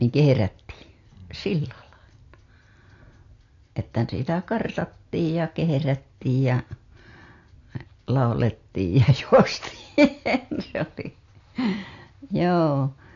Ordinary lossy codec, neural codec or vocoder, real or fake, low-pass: AAC, 48 kbps; none; real; 7.2 kHz